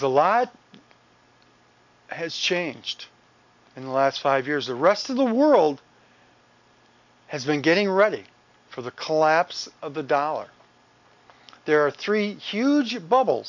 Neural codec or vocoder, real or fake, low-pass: none; real; 7.2 kHz